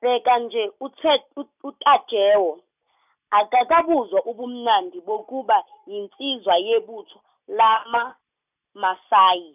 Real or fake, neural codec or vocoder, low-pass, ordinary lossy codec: real; none; 3.6 kHz; none